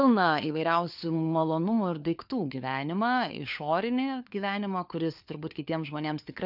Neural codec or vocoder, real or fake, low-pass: codec, 16 kHz, 4 kbps, FunCodec, trained on LibriTTS, 50 frames a second; fake; 5.4 kHz